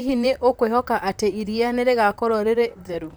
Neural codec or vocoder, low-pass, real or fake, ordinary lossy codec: vocoder, 44.1 kHz, 128 mel bands, Pupu-Vocoder; none; fake; none